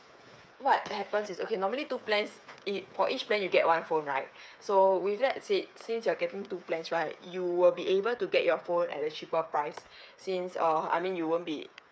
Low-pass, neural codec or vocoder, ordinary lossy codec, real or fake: none; codec, 16 kHz, 8 kbps, FreqCodec, smaller model; none; fake